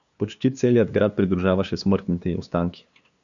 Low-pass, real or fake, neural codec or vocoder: 7.2 kHz; fake; codec, 16 kHz, 2 kbps, FunCodec, trained on LibriTTS, 25 frames a second